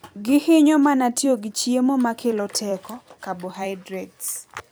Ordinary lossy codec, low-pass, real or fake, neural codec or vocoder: none; none; fake; vocoder, 44.1 kHz, 128 mel bands every 256 samples, BigVGAN v2